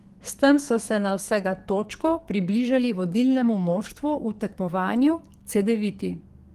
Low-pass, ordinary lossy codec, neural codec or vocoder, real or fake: 14.4 kHz; Opus, 24 kbps; codec, 44.1 kHz, 2.6 kbps, SNAC; fake